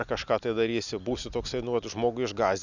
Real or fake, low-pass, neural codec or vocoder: real; 7.2 kHz; none